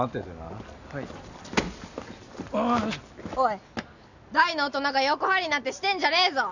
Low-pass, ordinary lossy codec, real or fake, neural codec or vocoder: 7.2 kHz; none; real; none